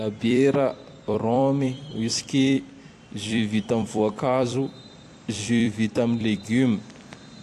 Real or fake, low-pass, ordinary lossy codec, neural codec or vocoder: fake; 14.4 kHz; AAC, 64 kbps; vocoder, 44.1 kHz, 128 mel bands every 256 samples, BigVGAN v2